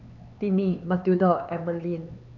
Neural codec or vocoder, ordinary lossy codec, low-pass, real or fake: codec, 16 kHz, 4 kbps, X-Codec, HuBERT features, trained on LibriSpeech; none; 7.2 kHz; fake